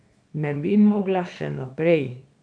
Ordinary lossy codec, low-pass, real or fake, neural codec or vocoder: none; 9.9 kHz; fake; codec, 24 kHz, 0.9 kbps, WavTokenizer, small release